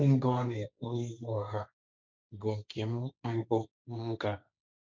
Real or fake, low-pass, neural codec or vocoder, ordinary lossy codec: fake; none; codec, 16 kHz, 1.1 kbps, Voila-Tokenizer; none